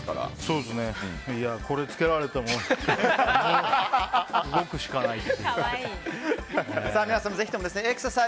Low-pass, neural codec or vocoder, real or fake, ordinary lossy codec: none; none; real; none